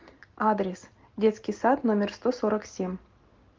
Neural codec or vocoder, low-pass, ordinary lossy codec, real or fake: none; 7.2 kHz; Opus, 32 kbps; real